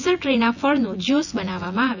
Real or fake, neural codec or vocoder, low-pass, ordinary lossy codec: fake; vocoder, 24 kHz, 100 mel bands, Vocos; 7.2 kHz; none